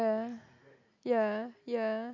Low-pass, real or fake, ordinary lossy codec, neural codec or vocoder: 7.2 kHz; real; none; none